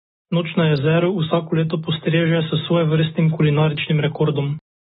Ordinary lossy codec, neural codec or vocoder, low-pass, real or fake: AAC, 16 kbps; none; 7.2 kHz; real